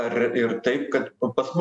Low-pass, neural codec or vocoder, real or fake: 10.8 kHz; none; real